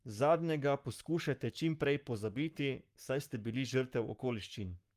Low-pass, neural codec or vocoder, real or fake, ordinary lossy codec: 14.4 kHz; codec, 44.1 kHz, 7.8 kbps, Pupu-Codec; fake; Opus, 32 kbps